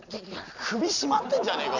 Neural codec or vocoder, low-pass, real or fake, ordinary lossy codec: none; 7.2 kHz; real; none